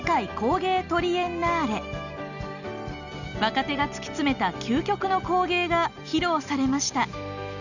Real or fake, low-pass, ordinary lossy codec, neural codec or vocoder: real; 7.2 kHz; none; none